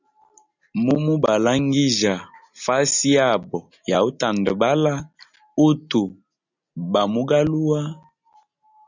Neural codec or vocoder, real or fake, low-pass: none; real; 7.2 kHz